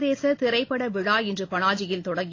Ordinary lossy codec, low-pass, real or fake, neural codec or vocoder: AAC, 32 kbps; 7.2 kHz; real; none